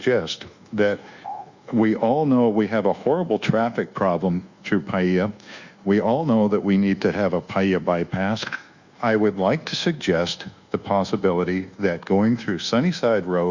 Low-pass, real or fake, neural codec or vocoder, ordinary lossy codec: 7.2 kHz; fake; codec, 24 kHz, 1.2 kbps, DualCodec; Opus, 64 kbps